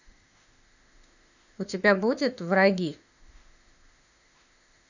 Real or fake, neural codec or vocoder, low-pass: fake; autoencoder, 48 kHz, 32 numbers a frame, DAC-VAE, trained on Japanese speech; 7.2 kHz